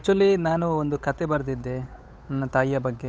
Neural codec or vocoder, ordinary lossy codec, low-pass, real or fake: codec, 16 kHz, 8 kbps, FunCodec, trained on Chinese and English, 25 frames a second; none; none; fake